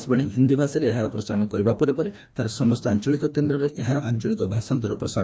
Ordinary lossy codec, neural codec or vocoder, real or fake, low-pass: none; codec, 16 kHz, 1 kbps, FreqCodec, larger model; fake; none